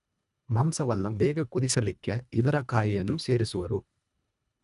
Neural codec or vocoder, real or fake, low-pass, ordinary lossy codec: codec, 24 kHz, 1.5 kbps, HILCodec; fake; 10.8 kHz; none